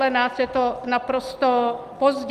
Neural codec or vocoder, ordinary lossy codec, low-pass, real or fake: none; Opus, 32 kbps; 14.4 kHz; real